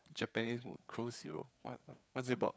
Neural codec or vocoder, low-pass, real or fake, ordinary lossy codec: codec, 16 kHz, 4 kbps, FreqCodec, larger model; none; fake; none